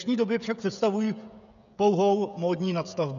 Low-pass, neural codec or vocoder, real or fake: 7.2 kHz; codec, 16 kHz, 16 kbps, FreqCodec, smaller model; fake